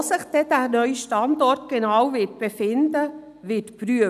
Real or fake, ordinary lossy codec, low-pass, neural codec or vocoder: real; none; 14.4 kHz; none